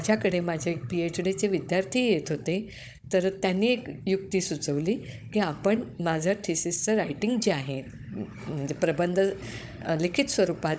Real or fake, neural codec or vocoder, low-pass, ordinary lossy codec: fake; codec, 16 kHz, 16 kbps, FunCodec, trained on Chinese and English, 50 frames a second; none; none